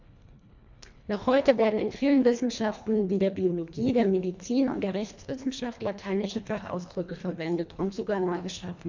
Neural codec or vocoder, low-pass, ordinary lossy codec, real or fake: codec, 24 kHz, 1.5 kbps, HILCodec; 7.2 kHz; MP3, 64 kbps; fake